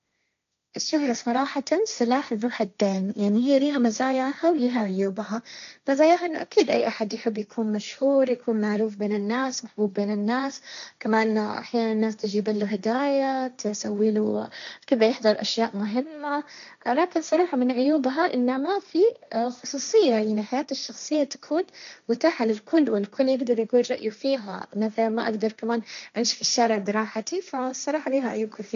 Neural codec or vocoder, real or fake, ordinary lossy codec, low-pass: codec, 16 kHz, 1.1 kbps, Voila-Tokenizer; fake; none; 7.2 kHz